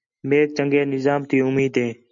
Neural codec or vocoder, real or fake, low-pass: none; real; 7.2 kHz